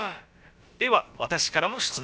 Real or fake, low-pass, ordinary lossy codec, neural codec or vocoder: fake; none; none; codec, 16 kHz, about 1 kbps, DyCAST, with the encoder's durations